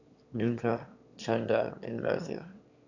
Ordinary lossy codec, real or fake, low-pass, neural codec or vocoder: none; fake; 7.2 kHz; autoencoder, 22.05 kHz, a latent of 192 numbers a frame, VITS, trained on one speaker